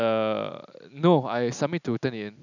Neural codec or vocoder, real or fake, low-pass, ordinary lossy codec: none; real; 7.2 kHz; none